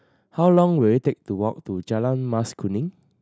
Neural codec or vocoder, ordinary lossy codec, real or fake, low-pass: none; none; real; none